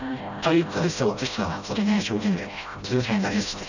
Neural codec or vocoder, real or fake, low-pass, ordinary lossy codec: codec, 16 kHz, 0.5 kbps, FreqCodec, smaller model; fake; 7.2 kHz; none